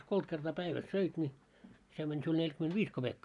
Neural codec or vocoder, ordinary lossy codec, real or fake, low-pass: none; none; real; 10.8 kHz